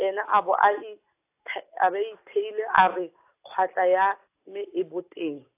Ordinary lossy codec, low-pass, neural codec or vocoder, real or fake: AAC, 24 kbps; 3.6 kHz; none; real